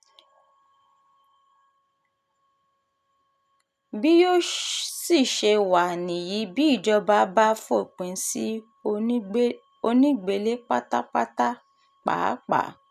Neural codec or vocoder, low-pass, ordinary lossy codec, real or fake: none; 14.4 kHz; none; real